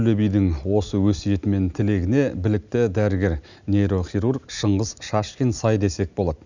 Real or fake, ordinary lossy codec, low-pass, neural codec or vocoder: real; none; 7.2 kHz; none